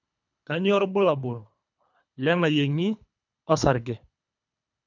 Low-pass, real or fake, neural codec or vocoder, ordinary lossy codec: 7.2 kHz; fake; codec, 24 kHz, 3 kbps, HILCodec; none